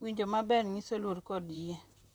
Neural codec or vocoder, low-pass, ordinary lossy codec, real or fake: vocoder, 44.1 kHz, 128 mel bands, Pupu-Vocoder; none; none; fake